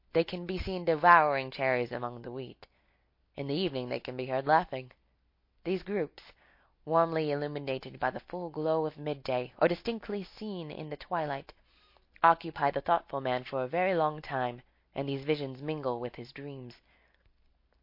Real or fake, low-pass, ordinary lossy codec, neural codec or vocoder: real; 5.4 kHz; MP3, 32 kbps; none